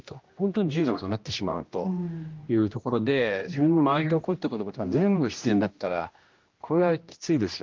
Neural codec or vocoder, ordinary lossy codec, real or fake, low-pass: codec, 16 kHz, 1 kbps, X-Codec, HuBERT features, trained on general audio; Opus, 24 kbps; fake; 7.2 kHz